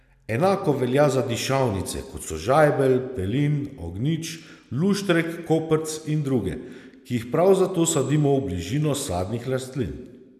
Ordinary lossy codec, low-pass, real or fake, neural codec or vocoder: none; 14.4 kHz; real; none